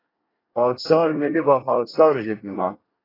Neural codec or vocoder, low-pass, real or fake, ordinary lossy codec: codec, 24 kHz, 1 kbps, SNAC; 5.4 kHz; fake; AAC, 24 kbps